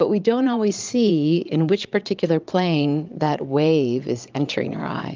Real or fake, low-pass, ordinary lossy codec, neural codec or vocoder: fake; 7.2 kHz; Opus, 24 kbps; vocoder, 22.05 kHz, 80 mel bands, Vocos